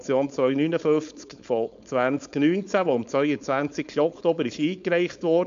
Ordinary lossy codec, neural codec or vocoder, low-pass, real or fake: MP3, 64 kbps; codec, 16 kHz, 4.8 kbps, FACodec; 7.2 kHz; fake